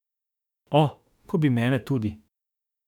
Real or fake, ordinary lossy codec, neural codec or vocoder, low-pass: fake; none; autoencoder, 48 kHz, 32 numbers a frame, DAC-VAE, trained on Japanese speech; 19.8 kHz